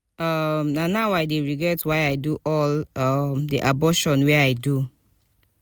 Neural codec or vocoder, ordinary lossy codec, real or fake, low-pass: none; none; real; none